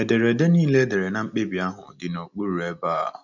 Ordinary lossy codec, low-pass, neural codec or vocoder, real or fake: none; 7.2 kHz; none; real